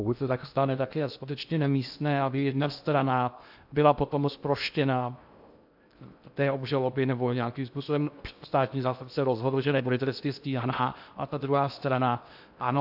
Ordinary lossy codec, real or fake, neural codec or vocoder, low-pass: AAC, 48 kbps; fake; codec, 16 kHz in and 24 kHz out, 0.6 kbps, FocalCodec, streaming, 2048 codes; 5.4 kHz